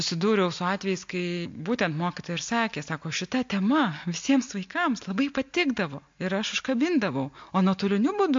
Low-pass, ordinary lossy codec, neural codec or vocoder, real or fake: 7.2 kHz; MP3, 48 kbps; none; real